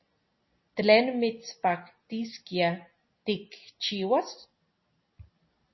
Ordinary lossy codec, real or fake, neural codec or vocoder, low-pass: MP3, 24 kbps; real; none; 7.2 kHz